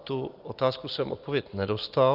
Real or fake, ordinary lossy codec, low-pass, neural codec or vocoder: real; Opus, 32 kbps; 5.4 kHz; none